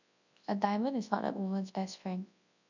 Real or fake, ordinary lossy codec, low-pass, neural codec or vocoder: fake; none; 7.2 kHz; codec, 24 kHz, 0.9 kbps, WavTokenizer, large speech release